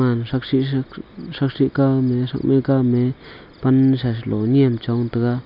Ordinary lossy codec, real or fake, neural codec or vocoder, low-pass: none; real; none; 5.4 kHz